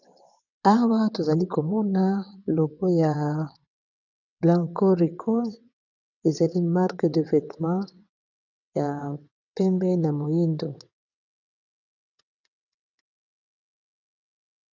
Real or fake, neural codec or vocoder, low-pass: fake; vocoder, 22.05 kHz, 80 mel bands, WaveNeXt; 7.2 kHz